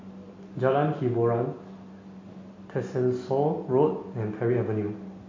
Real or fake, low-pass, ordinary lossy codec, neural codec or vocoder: real; 7.2 kHz; MP3, 32 kbps; none